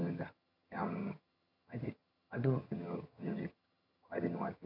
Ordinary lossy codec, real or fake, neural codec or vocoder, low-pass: none; fake; vocoder, 22.05 kHz, 80 mel bands, HiFi-GAN; 5.4 kHz